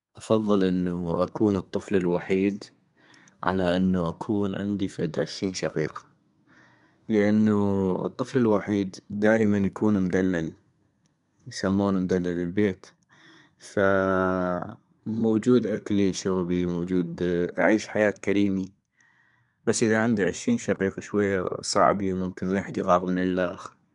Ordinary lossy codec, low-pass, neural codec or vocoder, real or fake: none; 10.8 kHz; codec, 24 kHz, 1 kbps, SNAC; fake